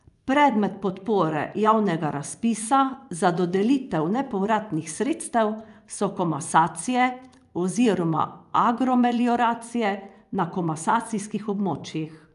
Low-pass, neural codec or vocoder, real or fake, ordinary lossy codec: 10.8 kHz; vocoder, 24 kHz, 100 mel bands, Vocos; fake; none